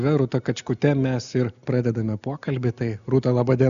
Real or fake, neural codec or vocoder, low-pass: real; none; 7.2 kHz